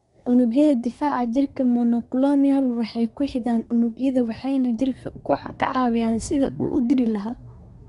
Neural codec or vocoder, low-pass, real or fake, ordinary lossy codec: codec, 24 kHz, 1 kbps, SNAC; 10.8 kHz; fake; none